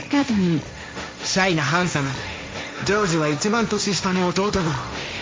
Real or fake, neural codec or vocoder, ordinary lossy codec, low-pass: fake; codec, 16 kHz, 1.1 kbps, Voila-Tokenizer; none; none